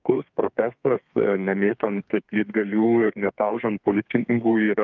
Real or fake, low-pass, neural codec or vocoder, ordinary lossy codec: fake; 7.2 kHz; codec, 32 kHz, 1.9 kbps, SNAC; Opus, 24 kbps